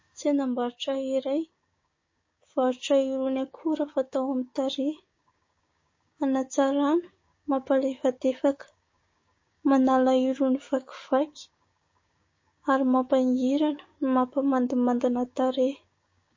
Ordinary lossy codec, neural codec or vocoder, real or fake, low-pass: MP3, 32 kbps; codec, 16 kHz, 6 kbps, DAC; fake; 7.2 kHz